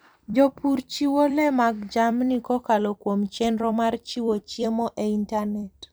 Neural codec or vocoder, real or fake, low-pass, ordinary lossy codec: vocoder, 44.1 kHz, 128 mel bands every 256 samples, BigVGAN v2; fake; none; none